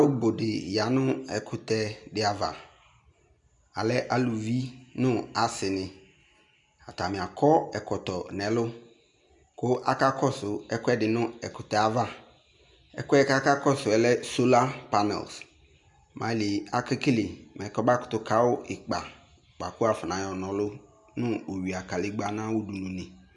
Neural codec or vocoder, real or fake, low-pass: none; real; 10.8 kHz